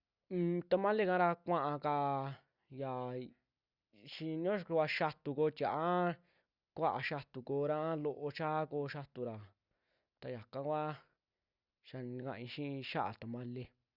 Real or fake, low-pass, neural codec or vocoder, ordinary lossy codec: real; 5.4 kHz; none; Opus, 64 kbps